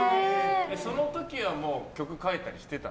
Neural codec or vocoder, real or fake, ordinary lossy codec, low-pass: none; real; none; none